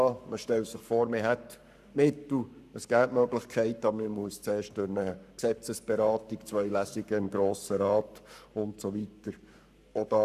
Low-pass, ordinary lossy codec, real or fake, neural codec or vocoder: 14.4 kHz; none; fake; codec, 44.1 kHz, 7.8 kbps, Pupu-Codec